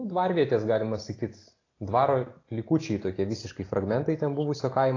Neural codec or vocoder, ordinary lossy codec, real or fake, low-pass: none; AAC, 32 kbps; real; 7.2 kHz